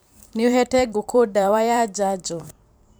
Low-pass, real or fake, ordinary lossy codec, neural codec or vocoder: none; fake; none; vocoder, 44.1 kHz, 128 mel bands every 512 samples, BigVGAN v2